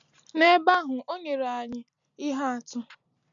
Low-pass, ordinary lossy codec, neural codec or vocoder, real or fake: 7.2 kHz; none; none; real